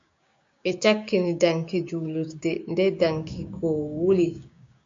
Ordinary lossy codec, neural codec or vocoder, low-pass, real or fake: MP3, 48 kbps; codec, 16 kHz, 6 kbps, DAC; 7.2 kHz; fake